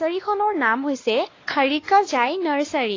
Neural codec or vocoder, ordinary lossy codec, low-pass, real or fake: codec, 16 kHz, 2 kbps, X-Codec, WavLM features, trained on Multilingual LibriSpeech; AAC, 32 kbps; 7.2 kHz; fake